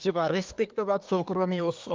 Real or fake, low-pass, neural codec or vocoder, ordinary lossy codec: fake; 7.2 kHz; codec, 24 kHz, 1 kbps, SNAC; Opus, 24 kbps